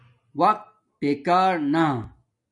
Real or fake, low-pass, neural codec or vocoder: real; 10.8 kHz; none